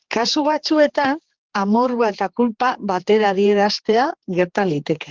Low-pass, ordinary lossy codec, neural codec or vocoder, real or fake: 7.2 kHz; Opus, 16 kbps; codec, 16 kHz, 4 kbps, X-Codec, HuBERT features, trained on general audio; fake